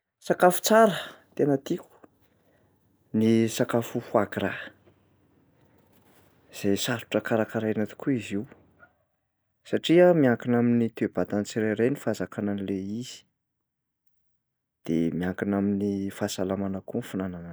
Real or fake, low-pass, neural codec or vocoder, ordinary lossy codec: real; none; none; none